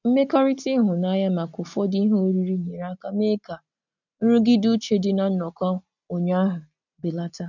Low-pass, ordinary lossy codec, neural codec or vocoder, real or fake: 7.2 kHz; none; none; real